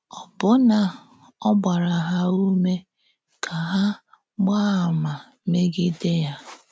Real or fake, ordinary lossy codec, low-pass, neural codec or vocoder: real; none; none; none